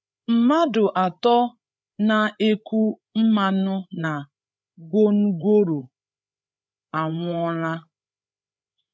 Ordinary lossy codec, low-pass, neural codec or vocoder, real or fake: none; none; codec, 16 kHz, 8 kbps, FreqCodec, larger model; fake